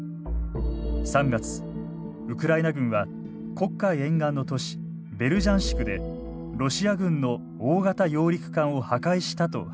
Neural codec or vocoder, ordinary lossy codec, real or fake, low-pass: none; none; real; none